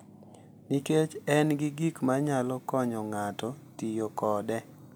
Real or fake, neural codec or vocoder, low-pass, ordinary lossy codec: real; none; none; none